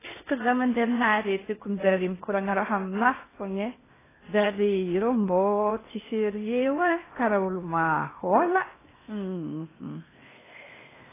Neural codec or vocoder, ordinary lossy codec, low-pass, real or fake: codec, 16 kHz in and 24 kHz out, 0.8 kbps, FocalCodec, streaming, 65536 codes; AAC, 16 kbps; 3.6 kHz; fake